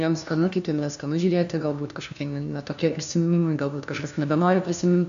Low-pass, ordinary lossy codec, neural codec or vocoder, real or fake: 7.2 kHz; AAC, 96 kbps; codec, 16 kHz, 1 kbps, FunCodec, trained on LibriTTS, 50 frames a second; fake